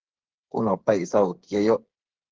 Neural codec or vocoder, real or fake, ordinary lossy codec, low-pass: codec, 16 kHz, 8 kbps, FreqCodec, smaller model; fake; Opus, 16 kbps; 7.2 kHz